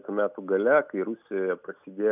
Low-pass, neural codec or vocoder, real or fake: 3.6 kHz; none; real